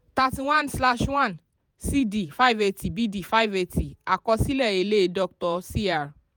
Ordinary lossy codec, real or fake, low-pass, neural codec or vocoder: none; fake; none; vocoder, 48 kHz, 128 mel bands, Vocos